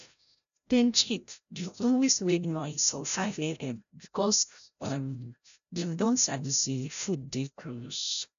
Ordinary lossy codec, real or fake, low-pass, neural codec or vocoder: MP3, 96 kbps; fake; 7.2 kHz; codec, 16 kHz, 0.5 kbps, FreqCodec, larger model